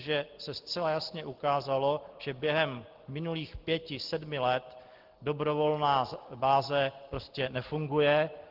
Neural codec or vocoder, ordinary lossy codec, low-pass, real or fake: none; Opus, 16 kbps; 5.4 kHz; real